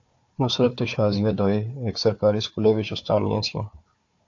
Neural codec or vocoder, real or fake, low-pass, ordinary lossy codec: codec, 16 kHz, 4 kbps, FunCodec, trained on Chinese and English, 50 frames a second; fake; 7.2 kHz; AAC, 48 kbps